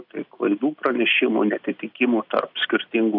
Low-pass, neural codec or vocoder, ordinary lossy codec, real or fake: 5.4 kHz; none; AAC, 48 kbps; real